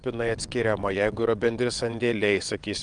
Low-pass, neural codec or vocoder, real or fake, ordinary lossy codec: 9.9 kHz; vocoder, 22.05 kHz, 80 mel bands, WaveNeXt; fake; Opus, 32 kbps